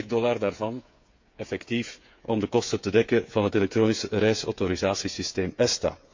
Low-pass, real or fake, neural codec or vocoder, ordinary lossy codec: 7.2 kHz; fake; codec, 16 kHz, 8 kbps, FreqCodec, smaller model; MP3, 48 kbps